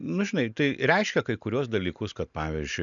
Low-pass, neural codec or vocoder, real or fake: 7.2 kHz; none; real